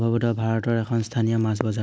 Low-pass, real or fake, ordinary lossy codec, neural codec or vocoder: none; real; none; none